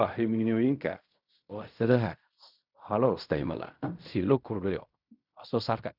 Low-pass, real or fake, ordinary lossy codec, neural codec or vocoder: 5.4 kHz; fake; none; codec, 16 kHz in and 24 kHz out, 0.4 kbps, LongCat-Audio-Codec, fine tuned four codebook decoder